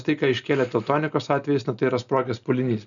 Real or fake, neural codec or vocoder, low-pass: real; none; 7.2 kHz